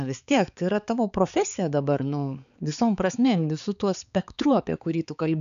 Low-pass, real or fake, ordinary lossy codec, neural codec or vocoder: 7.2 kHz; fake; MP3, 96 kbps; codec, 16 kHz, 4 kbps, X-Codec, HuBERT features, trained on balanced general audio